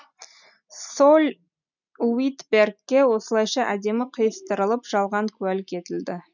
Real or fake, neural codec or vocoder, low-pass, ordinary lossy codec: real; none; 7.2 kHz; none